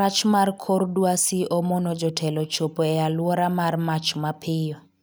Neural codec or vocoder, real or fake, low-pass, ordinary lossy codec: none; real; none; none